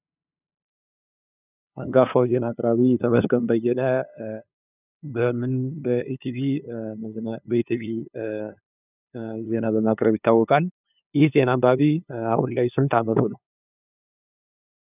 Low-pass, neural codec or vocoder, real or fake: 3.6 kHz; codec, 16 kHz, 2 kbps, FunCodec, trained on LibriTTS, 25 frames a second; fake